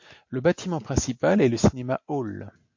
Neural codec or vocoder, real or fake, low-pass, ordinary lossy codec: none; real; 7.2 kHz; MP3, 48 kbps